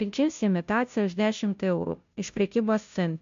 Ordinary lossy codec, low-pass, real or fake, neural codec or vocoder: MP3, 64 kbps; 7.2 kHz; fake; codec, 16 kHz, 0.5 kbps, FunCodec, trained on Chinese and English, 25 frames a second